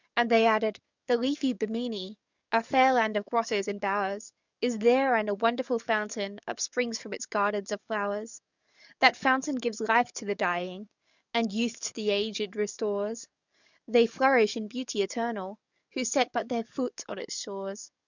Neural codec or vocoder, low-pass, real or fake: codec, 44.1 kHz, 7.8 kbps, DAC; 7.2 kHz; fake